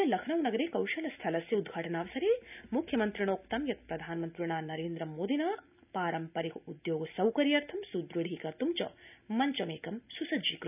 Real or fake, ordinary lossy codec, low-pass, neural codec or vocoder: real; none; 3.6 kHz; none